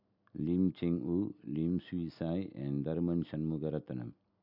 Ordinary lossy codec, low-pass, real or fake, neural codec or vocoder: none; 5.4 kHz; real; none